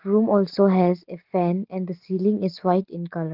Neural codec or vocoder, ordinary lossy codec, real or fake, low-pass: none; Opus, 16 kbps; real; 5.4 kHz